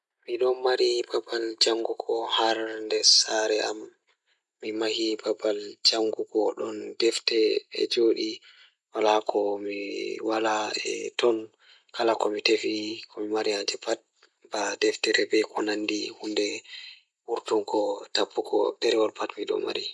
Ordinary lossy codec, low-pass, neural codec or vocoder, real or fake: none; none; none; real